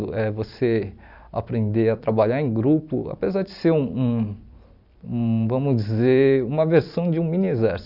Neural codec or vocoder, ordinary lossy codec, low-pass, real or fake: vocoder, 44.1 kHz, 128 mel bands every 256 samples, BigVGAN v2; none; 5.4 kHz; fake